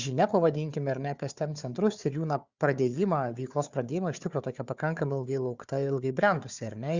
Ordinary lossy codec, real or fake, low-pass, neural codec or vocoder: Opus, 64 kbps; fake; 7.2 kHz; codec, 16 kHz, 4 kbps, FunCodec, trained on Chinese and English, 50 frames a second